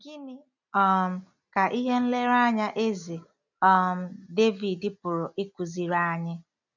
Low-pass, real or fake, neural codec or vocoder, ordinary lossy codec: 7.2 kHz; real; none; none